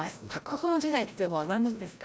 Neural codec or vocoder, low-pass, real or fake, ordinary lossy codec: codec, 16 kHz, 0.5 kbps, FreqCodec, larger model; none; fake; none